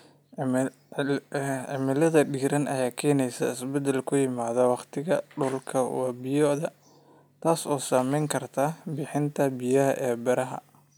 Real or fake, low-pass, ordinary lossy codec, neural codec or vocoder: real; none; none; none